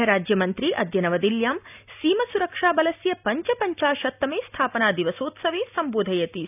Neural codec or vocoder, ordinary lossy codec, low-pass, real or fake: none; none; 3.6 kHz; real